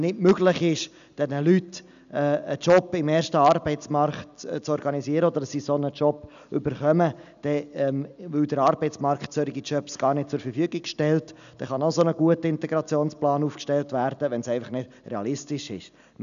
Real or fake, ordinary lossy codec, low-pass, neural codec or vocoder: real; none; 7.2 kHz; none